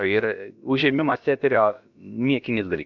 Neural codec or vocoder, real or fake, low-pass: codec, 16 kHz, about 1 kbps, DyCAST, with the encoder's durations; fake; 7.2 kHz